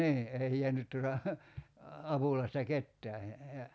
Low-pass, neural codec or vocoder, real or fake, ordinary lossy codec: none; none; real; none